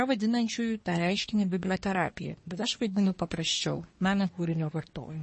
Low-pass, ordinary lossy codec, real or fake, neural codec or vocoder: 10.8 kHz; MP3, 32 kbps; fake; codec, 24 kHz, 1 kbps, SNAC